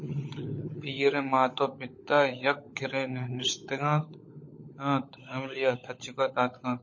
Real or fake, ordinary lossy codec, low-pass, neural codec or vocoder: fake; MP3, 32 kbps; 7.2 kHz; codec, 16 kHz, 16 kbps, FunCodec, trained on LibriTTS, 50 frames a second